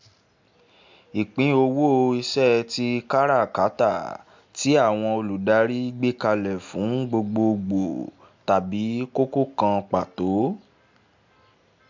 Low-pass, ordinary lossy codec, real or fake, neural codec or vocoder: 7.2 kHz; MP3, 64 kbps; real; none